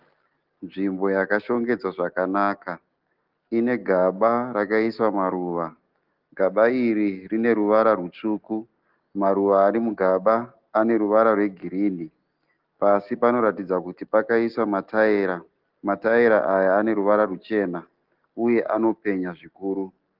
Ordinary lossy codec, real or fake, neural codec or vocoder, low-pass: Opus, 16 kbps; real; none; 5.4 kHz